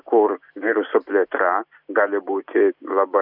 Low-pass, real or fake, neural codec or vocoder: 5.4 kHz; real; none